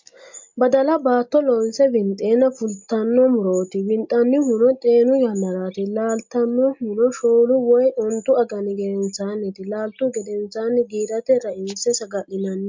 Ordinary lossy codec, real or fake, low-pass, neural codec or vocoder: MP3, 48 kbps; real; 7.2 kHz; none